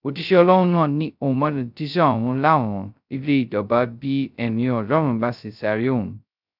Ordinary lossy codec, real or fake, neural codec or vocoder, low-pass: none; fake; codec, 16 kHz, 0.2 kbps, FocalCodec; 5.4 kHz